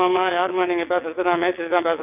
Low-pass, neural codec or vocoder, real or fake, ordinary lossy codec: 3.6 kHz; vocoder, 22.05 kHz, 80 mel bands, WaveNeXt; fake; none